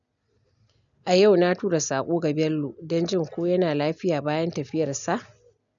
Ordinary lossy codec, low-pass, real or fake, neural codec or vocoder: none; 7.2 kHz; real; none